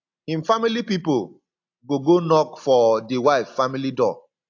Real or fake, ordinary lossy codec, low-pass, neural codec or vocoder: real; AAC, 48 kbps; 7.2 kHz; none